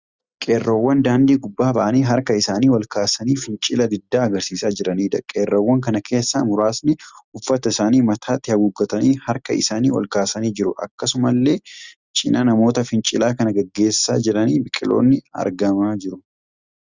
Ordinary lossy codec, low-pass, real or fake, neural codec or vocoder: Opus, 64 kbps; 7.2 kHz; real; none